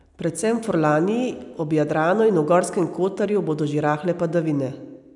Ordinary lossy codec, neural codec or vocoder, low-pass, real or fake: none; none; 10.8 kHz; real